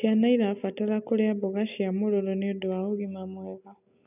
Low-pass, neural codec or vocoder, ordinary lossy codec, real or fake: 3.6 kHz; none; none; real